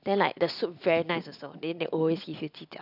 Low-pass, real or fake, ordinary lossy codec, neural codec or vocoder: 5.4 kHz; real; none; none